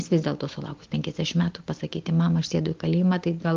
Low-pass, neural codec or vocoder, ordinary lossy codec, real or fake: 7.2 kHz; none; Opus, 32 kbps; real